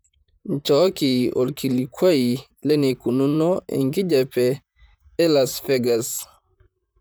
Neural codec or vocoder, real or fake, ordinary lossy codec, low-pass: vocoder, 44.1 kHz, 128 mel bands, Pupu-Vocoder; fake; none; none